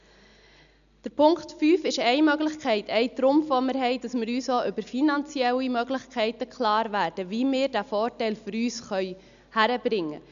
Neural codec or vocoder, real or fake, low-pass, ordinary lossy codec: none; real; 7.2 kHz; none